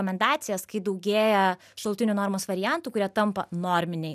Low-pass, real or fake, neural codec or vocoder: 14.4 kHz; real; none